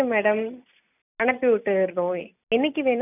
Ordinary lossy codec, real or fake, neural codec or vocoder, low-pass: none; real; none; 3.6 kHz